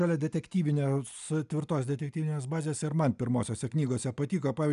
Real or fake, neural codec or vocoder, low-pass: real; none; 10.8 kHz